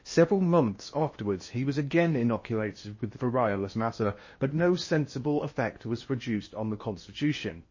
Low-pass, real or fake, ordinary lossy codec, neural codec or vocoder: 7.2 kHz; fake; MP3, 32 kbps; codec, 16 kHz in and 24 kHz out, 0.8 kbps, FocalCodec, streaming, 65536 codes